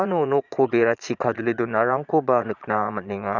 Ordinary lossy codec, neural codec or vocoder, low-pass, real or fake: none; vocoder, 22.05 kHz, 80 mel bands, Vocos; 7.2 kHz; fake